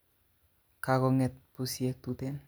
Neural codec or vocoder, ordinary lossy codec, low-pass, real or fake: none; none; none; real